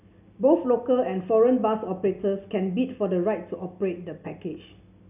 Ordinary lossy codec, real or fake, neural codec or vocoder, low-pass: none; real; none; 3.6 kHz